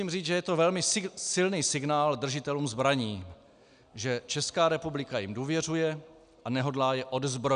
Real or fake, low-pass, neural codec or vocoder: real; 9.9 kHz; none